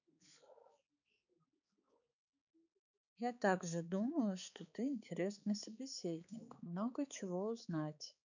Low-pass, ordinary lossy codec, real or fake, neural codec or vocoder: 7.2 kHz; none; fake; codec, 16 kHz, 4 kbps, X-Codec, HuBERT features, trained on balanced general audio